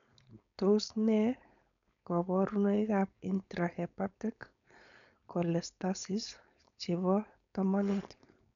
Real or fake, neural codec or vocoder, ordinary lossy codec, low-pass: fake; codec, 16 kHz, 4.8 kbps, FACodec; none; 7.2 kHz